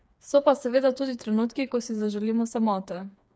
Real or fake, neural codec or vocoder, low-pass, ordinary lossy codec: fake; codec, 16 kHz, 4 kbps, FreqCodec, smaller model; none; none